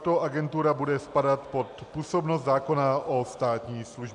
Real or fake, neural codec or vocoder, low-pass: real; none; 10.8 kHz